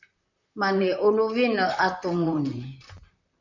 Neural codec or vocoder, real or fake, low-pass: vocoder, 44.1 kHz, 128 mel bands, Pupu-Vocoder; fake; 7.2 kHz